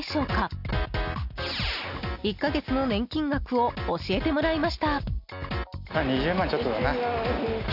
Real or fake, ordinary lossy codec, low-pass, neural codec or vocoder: real; none; 5.4 kHz; none